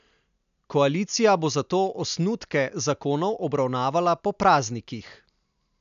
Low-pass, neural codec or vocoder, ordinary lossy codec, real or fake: 7.2 kHz; none; none; real